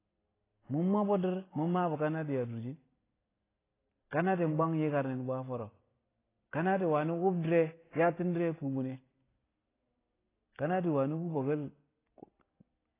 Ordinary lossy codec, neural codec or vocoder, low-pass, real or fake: AAC, 16 kbps; none; 3.6 kHz; real